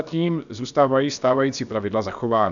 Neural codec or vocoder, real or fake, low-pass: codec, 16 kHz, about 1 kbps, DyCAST, with the encoder's durations; fake; 7.2 kHz